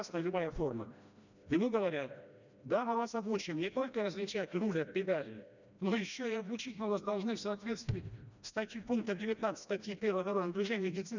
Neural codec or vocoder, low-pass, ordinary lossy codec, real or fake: codec, 16 kHz, 1 kbps, FreqCodec, smaller model; 7.2 kHz; none; fake